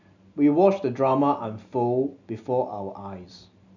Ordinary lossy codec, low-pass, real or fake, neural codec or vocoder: none; 7.2 kHz; real; none